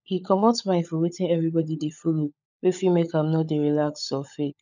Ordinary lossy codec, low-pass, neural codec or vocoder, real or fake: none; 7.2 kHz; codec, 16 kHz, 16 kbps, FunCodec, trained on LibriTTS, 50 frames a second; fake